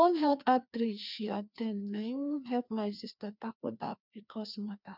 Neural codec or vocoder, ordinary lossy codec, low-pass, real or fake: codec, 16 kHz, 2 kbps, FreqCodec, larger model; none; 5.4 kHz; fake